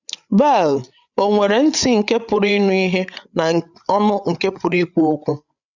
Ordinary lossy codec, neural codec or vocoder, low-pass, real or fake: none; codec, 16 kHz, 8 kbps, FreqCodec, larger model; 7.2 kHz; fake